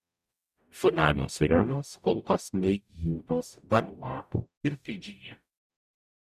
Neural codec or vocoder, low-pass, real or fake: codec, 44.1 kHz, 0.9 kbps, DAC; 14.4 kHz; fake